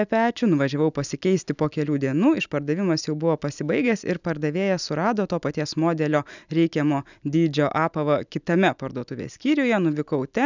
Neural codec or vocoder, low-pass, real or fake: none; 7.2 kHz; real